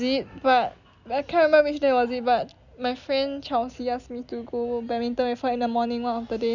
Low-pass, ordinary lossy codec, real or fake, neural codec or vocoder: 7.2 kHz; none; real; none